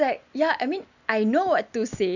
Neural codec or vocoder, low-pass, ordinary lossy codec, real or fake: none; 7.2 kHz; none; real